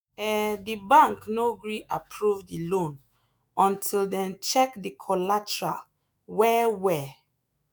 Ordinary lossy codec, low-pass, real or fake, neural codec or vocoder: none; none; fake; autoencoder, 48 kHz, 128 numbers a frame, DAC-VAE, trained on Japanese speech